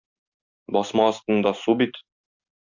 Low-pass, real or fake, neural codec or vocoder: 7.2 kHz; real; none